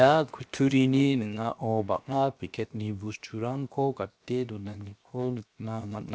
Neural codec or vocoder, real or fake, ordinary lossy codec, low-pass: codec, 16 kHz, 0.7 kbps, FocalCodec; fake; none; none